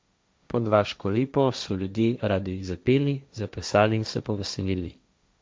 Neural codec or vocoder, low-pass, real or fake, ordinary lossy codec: codec, 16 kHz, 1.1 kbps, Voila-Tokenizer; none; fake; none